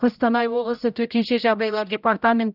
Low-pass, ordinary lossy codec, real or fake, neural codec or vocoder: 5.4 kHz; none; fake; codec, 16 kHz, 0.5 kbps, X-Codec, HuBERT features, trained on general audio